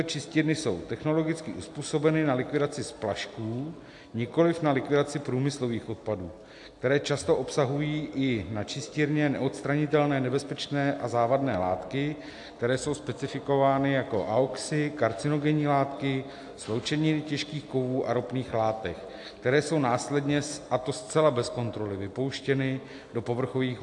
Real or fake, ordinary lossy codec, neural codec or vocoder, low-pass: real; AAC, 64 kbps; none; 10.8 kHz